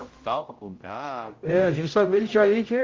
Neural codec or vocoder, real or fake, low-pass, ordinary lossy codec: codec, 16 kHz, 0.5 kbps, X-Codec, HuBERT features, trained on general audio; fake; 7.2 kHz; Opus, 32 kbps